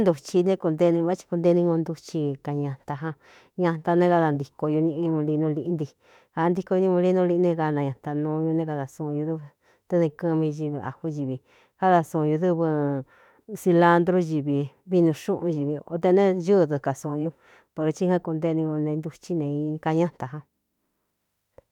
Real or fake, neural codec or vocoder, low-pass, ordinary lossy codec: fake; autoencoder, 48 kHz, 32 numbers a frame, DAC-VAE, trained on Japanese speech; 19.8 kHz; none